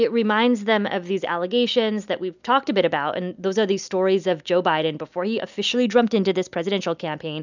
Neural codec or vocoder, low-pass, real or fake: none; 7.2 kHz; real